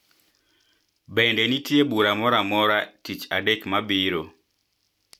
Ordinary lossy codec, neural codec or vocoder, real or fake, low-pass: none; none; real; 19.8 kHz